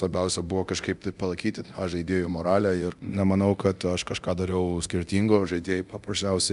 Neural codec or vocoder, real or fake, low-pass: codec, 24 kHz, 0.9 kbps, DualCodec; fake; 10.8 kHz